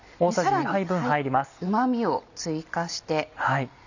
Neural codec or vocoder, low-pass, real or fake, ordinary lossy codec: none; 7.2 kHz; real; none